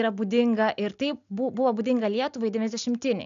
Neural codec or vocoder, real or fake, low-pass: none; real; 7.2 kHz